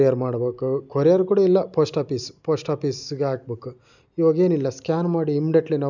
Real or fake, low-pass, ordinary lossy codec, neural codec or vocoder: real; 7.2 kHz; none; none